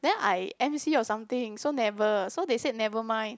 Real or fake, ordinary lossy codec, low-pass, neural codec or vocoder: real; none; none; none